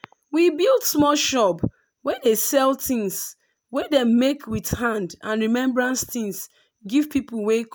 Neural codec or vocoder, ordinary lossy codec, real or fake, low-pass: none; none; real; none